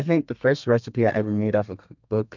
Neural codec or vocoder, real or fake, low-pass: codec, 32 kHz, 1.9 kbps, SNAC; fake; 7.2 kHz